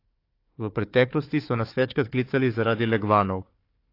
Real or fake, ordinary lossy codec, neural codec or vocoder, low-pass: fake; AAC, 32 kbps; codec, 16 kHz, 4 kbps, FunCodec, trained on Chinese and English, 50 frames a second; 5.4 kHz